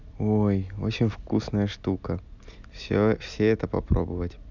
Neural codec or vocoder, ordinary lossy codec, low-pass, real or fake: none; none; 7.2 kHz; real